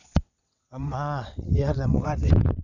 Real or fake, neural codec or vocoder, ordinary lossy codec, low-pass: fake; vocoder, 22.05 kHz, 80 mel bands, WaveNeXt; none; 7.2 kHz